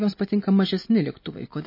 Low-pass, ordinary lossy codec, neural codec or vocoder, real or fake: 5.4 kHz; MP3, 24 kbps; none; real